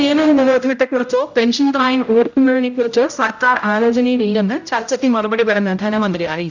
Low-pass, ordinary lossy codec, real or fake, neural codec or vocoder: 7.2 kHz; none; fake; codec, 16 kHz, 0.5 kbps, X-Codec, HuBERT features, trained on general audio